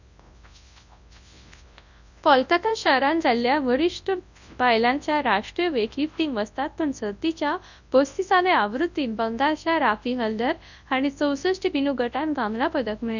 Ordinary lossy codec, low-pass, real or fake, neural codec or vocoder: none; 7.2 kHz; fake; codec, 24 kHz, 0.9 kbps, WavTokenizer, large speech release